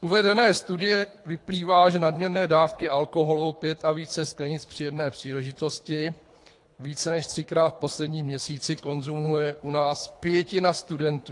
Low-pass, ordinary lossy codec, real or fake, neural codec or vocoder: 10.8 kHz; AAC, 48 kbps; fake; codec, 24 kHz, 3 kbps, HILCodec